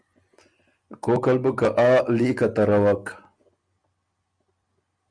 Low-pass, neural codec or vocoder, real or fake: 9.9 kHz; none; real